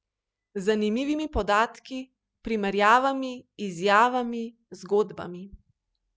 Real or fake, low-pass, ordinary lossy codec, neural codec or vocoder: real; none; none; none